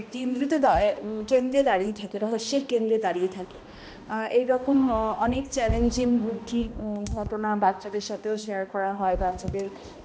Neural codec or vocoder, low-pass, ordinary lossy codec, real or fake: codec, 16 kHz, 1 kbps, X-Codec, HuBERT features, trained on balanced general audio; none; none; fake